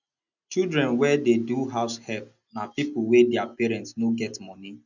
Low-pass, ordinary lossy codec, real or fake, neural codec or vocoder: 7.2 kHz; none; real; none